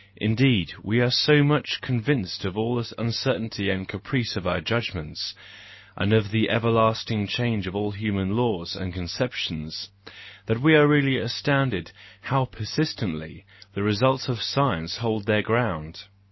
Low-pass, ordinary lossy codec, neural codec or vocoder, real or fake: 7.2 kHz; MP3, 24 kbps; none; real